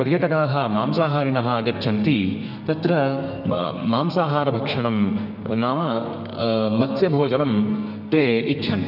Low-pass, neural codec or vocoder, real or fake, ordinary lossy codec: 5.4 kHz; codec, 32 kHz, 1.9 kbps, SNAC; fake; none